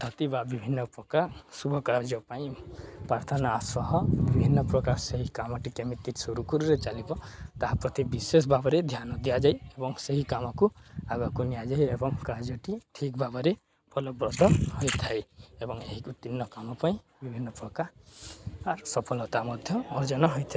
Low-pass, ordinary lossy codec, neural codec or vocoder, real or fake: none; none; none; real